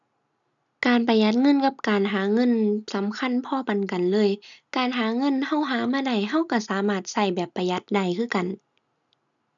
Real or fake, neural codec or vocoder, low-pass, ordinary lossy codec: real; none; 7.2 kHz; none